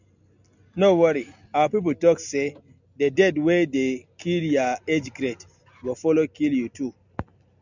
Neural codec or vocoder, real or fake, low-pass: none; real; 7.2 kHz